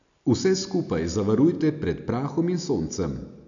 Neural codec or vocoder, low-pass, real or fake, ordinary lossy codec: none; 7.2 kHz; real; none